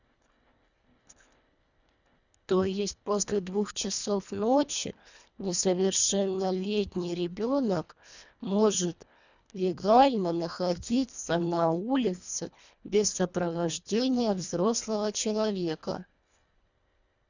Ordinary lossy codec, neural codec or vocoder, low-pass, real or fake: none; codec, 24 kHz, 1.5 kbps, HILCodec; 7.2 kHz; fake